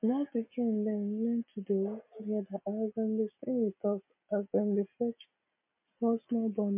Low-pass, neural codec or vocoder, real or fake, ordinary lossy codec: 3.6 kHz; none; real; MP3, 32 kbps